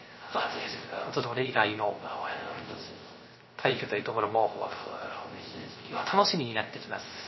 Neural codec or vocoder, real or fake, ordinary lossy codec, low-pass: codec, 16 kHz, 0.3 kbps, FocalCodec; fake; MP3, 24 kbps; 7.2 kHz